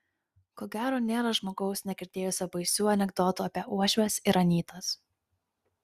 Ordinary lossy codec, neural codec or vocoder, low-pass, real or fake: Opus, 64 kbps; none; 14.4 kHz; real